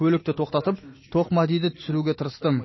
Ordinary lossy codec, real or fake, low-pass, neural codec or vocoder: MP3, 24 kbps; real; 7.2 kHz; none